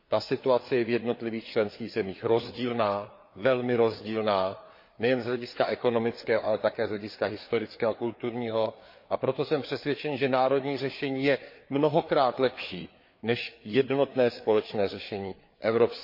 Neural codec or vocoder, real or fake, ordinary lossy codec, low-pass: codec, 16 kHz, 4 kbps, FreqCodec, larger model; fake; MP3, 32 kbps; 5.4 kHz